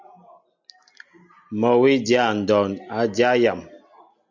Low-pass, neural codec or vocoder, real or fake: 7.2 kHz; none; real